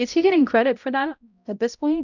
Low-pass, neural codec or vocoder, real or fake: 7.2 kHz; codec, 16 kHz, 0.5 kbps, X-Codec, HuBERT features, trained on balanced general audio; fake